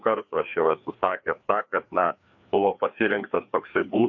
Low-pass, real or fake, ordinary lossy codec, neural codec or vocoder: 7.2 kHz; fake; AAC, 48 kbps; codec, 16 kHz, 2 kbps, FreqCodec, larger model